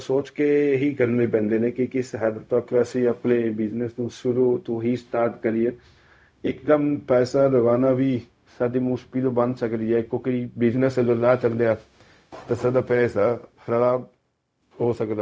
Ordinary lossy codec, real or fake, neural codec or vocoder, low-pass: none; fake; codec, 16 kHz, 0.4 kbps, LongCat-Audio-Codec; none